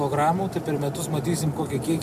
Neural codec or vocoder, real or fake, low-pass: none; real; 14.4 kHz